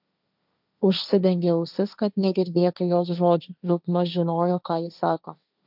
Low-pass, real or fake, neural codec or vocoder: 5.4 kHz; fake; codec, 16 kHz, 1.1 kbps, Voila-Tokenizer